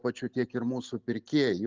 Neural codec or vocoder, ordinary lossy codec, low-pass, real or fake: none; Opus, 32 kbps; 7.2 kHz; real